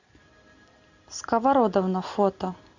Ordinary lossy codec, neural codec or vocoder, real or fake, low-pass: AAC, 48 kbps; none; real; 7.2 kHz